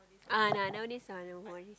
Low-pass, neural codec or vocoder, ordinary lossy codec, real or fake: none; none; none; real